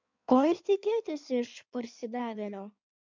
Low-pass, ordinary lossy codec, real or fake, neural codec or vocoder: 7.2 kHz; MP3, 64 kbps; fake; codec, 16 kHz in and 24 kHz out, 1.1 kbps, FireRedTTS-2 codec